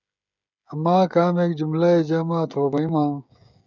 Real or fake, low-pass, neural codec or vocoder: fake; 7.2 kHz; codec, 16 kHz, 16 kbps, FreqCodec, smaller model